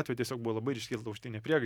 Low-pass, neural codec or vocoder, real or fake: 19.8 kHz; none; real